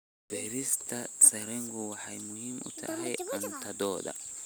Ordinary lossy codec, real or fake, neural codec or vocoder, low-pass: none; real; none; none